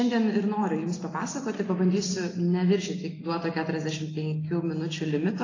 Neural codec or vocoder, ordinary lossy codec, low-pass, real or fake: none; AAC, 32 kbps; 7.2 kHz; real